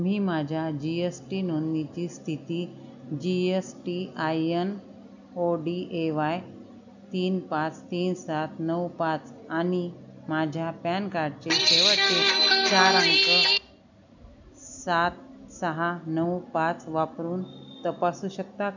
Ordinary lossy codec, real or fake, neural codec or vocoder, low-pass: none; real; none; 7.2 kHz